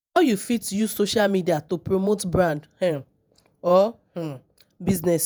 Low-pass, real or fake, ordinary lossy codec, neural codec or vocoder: none; fake; none; vocoder, 48 kHz, 128 mel bands, Vocos